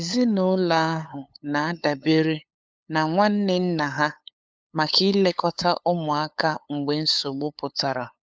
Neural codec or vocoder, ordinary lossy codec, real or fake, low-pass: codec, 16 kHz, 8 kbps, FunCodec, trained on LibriTTS, 25 frames a second; none; fake; none